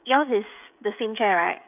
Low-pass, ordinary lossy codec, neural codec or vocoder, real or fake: 3.6 kHz; none; codec, 16 kHz, 16 kbps, FreqCodec, smaller model; fake